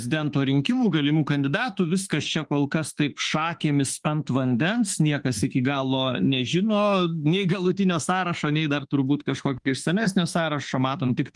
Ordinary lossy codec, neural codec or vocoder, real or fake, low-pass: Opus, 24 kbps; autoencoder, 48 kHz, 32 numbers a frame, DAC-VAE, trained on Japanese speech; fake; 10.8 kHz